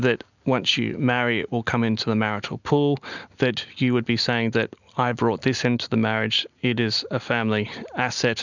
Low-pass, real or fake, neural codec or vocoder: 7.2 kHz; real; none